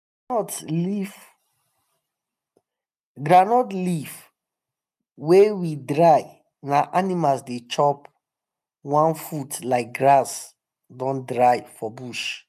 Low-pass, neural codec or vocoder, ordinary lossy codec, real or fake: 14.4 kHz; none; none; real